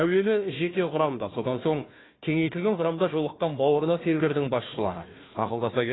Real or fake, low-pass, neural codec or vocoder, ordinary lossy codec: fake; 7.2 kHz; codec, 16 kHz, 1 kbps, FunCodec, trained on Chinese and English, 50 frames a second; AAC, 16 kbps